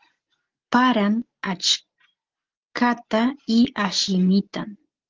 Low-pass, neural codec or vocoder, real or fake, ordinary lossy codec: 7.2 kHz; none; real; Opus, 16 kbps